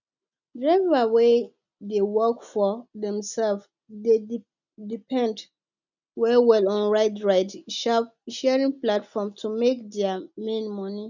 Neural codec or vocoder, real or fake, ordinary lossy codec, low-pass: none; real; none; 7.2 kHz